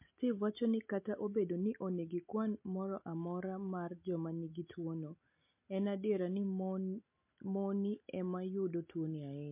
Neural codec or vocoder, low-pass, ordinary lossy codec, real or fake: none; 3.6 kHz; MP3, 24 kbps; real